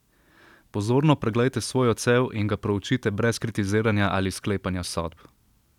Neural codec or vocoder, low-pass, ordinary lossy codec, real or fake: none; 19.8 kHz; none; real